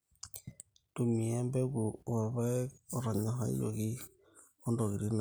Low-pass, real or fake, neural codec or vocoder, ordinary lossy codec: none; real; none; none